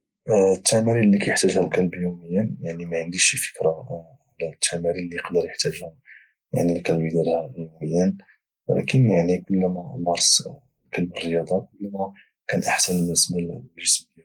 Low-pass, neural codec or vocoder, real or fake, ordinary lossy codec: 19.8 kHz; none; real; Opus, 24 kbps